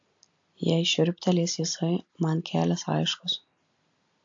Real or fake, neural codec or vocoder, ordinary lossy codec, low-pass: real; none; AAC, 64 kbps; 7.2 kHz